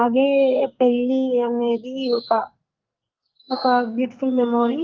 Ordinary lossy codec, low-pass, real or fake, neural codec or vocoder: Opus, 24 kbps; 7.2 kHz; fake; codec, 44.1 kHz, 2.6 kbps, SNAC